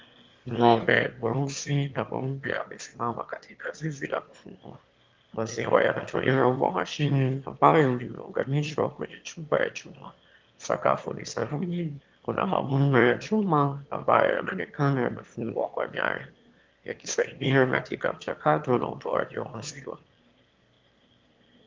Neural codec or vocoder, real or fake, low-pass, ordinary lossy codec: autoencoder, 22.05 kHz, a latent of 192 numbers a frame, VITS, trained on one speaker; fake; 7.2 kHz; Opus, 32 kbps